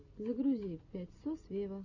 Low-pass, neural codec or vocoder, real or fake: 7.2 kHz; none; real